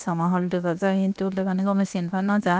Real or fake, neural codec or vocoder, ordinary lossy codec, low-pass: fake; codec, 16 kHz, 0.7 kbps, FocalCodec; none; none